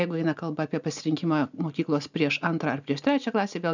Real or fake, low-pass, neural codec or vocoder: real; 7.2 kHz; none